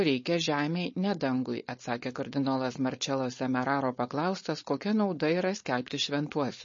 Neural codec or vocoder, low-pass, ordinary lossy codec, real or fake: codec, 16 kHz, 4.8 kbps, FACodec; 7.2 kHz; MP3, 32 kbps; fake